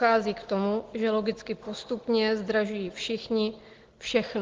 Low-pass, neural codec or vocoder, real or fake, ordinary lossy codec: 7.2 kHz; none; real; Opus, 16 kbps